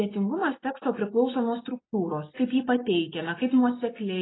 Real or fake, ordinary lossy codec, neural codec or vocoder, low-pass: real; AAC, 16 kbps; none; 7.2 kHz